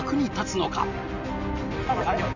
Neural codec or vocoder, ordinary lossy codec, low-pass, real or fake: none; none; 7.2 kHz; real